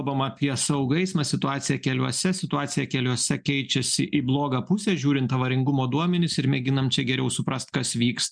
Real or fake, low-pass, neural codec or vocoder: real; 9.9 kHz; none